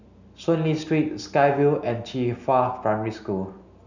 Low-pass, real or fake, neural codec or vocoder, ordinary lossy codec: 7.2 kHz; real; none; none